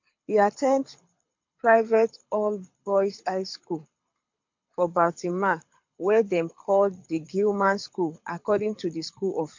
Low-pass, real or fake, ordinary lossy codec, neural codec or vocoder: 7.2 kHz; fake; MP3, 48 kbps; codec, 24 kHz, 6 kbps, HILCodec